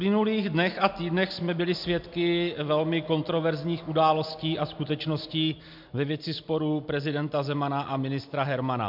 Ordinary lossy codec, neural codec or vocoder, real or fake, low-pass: MP3, 48 kbps; none; real; 5.4 kHz